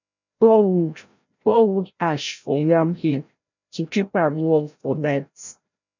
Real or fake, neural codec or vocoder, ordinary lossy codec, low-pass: fake; codec, 16 kHz, 0.5 kbps, FreqCodec, larger model; none; 7.2 kHz